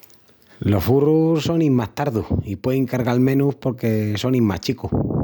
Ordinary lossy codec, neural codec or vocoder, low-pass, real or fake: none; none; none; real